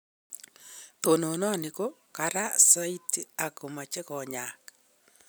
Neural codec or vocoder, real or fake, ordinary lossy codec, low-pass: none; real; none; none